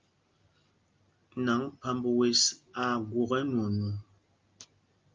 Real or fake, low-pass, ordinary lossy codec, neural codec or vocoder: real; 7.2 kHz; Opus, 24 kbps; none